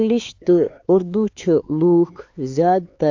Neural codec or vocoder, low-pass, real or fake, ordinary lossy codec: codec, 16 kHz, 4 kbps, X-Codec, HuBERT features, trained on LibriSpeech; 7.2 kHz; fake; AAC, 48 kbps